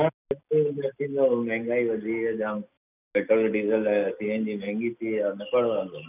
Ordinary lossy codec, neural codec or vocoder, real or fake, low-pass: none; none; real; 3.6 kHz